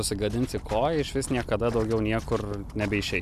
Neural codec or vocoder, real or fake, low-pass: none; real; 14.4 kHz